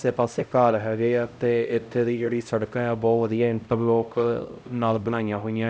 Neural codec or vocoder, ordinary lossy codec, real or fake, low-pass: codec, 16 kHz, 0.5 kbps, X-Codec, HuBERT features, trained on LibriSpeech; none; fake; none